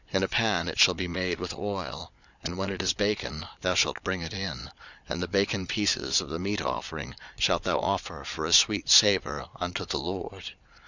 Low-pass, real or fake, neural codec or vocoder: 7.2 kHz; fake; vocoder, 22.05 kHz, 80 mel bands, Vocos